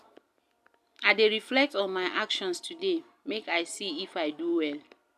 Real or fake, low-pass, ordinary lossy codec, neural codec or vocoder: real; 14.4 kHz; AAC, 96 kbps; none